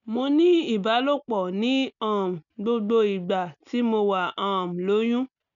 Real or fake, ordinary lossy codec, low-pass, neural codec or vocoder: real; none; 7.2 kHz; none